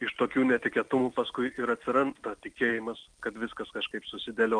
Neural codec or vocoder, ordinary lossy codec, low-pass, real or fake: none; Opus, 32 kbps; 9.9 kHz; real